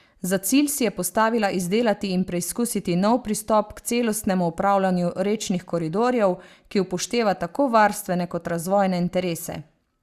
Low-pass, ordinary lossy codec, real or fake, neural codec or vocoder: 14.4 kHz; Opus, 64 kbps; real; none